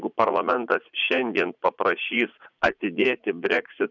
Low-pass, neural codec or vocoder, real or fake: 7.2 kHz; vocoder, 44.1 kHz, 80 mel bands, Vocos; fake